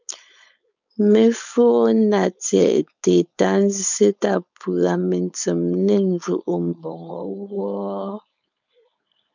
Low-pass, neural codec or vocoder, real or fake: 7.2 kHz; codec, 16 kHz, 4.8 kbps, FACodec; fake